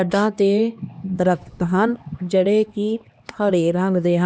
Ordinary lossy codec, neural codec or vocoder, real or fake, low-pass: none; codec, 16 kHz, 2 kbps, X-Codec, HuBERT features, trained on LibriSpeech; fake; none